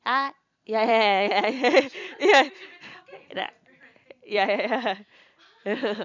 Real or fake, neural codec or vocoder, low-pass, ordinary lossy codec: fake; vocoder, 44.1 kHz, 128 mel bands every 256 samples, BigVGAN v2; 7.2 kHz; none